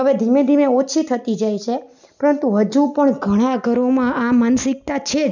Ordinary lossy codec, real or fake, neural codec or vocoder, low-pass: none; real; none; 7.2 kHz